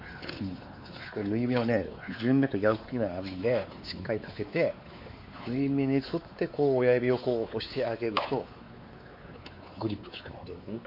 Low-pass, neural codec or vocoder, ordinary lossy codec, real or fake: 5.4 kHz; codec, 16 kHz, 4 kbps, X-Codec, WavLM features, trained on Multilingual LibriSpeech; MP3, 32 kbps; fake